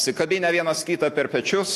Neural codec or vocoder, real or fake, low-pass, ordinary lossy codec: none; real; 14.4 kHz; AAC, 64 kbps